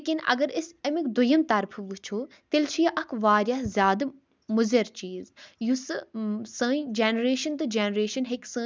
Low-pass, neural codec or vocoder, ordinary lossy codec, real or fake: none; none; none; real